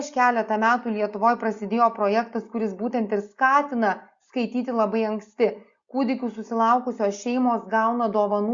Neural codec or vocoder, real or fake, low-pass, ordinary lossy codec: none; real; 7.2 kHz; Opus, 64 kbps